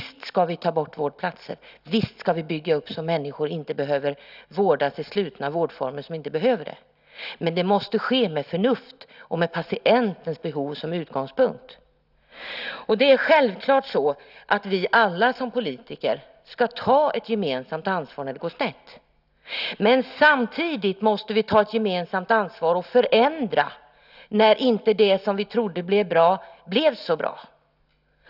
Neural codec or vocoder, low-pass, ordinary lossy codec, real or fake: none; 5.4 kHz; none; real